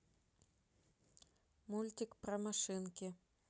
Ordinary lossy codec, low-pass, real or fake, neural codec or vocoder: none; none; real; none